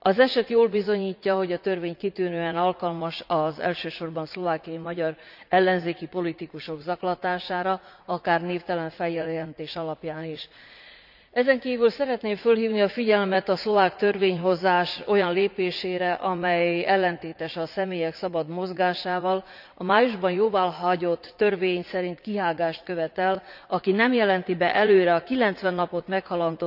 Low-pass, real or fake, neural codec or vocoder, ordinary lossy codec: 5.4 kHz; fake; vocoder, 44.1 kHz, 80 mel bands, Vocos; none